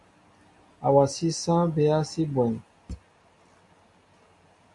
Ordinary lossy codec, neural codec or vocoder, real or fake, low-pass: AAC, 64 kbps; none; real; 10.8 kHz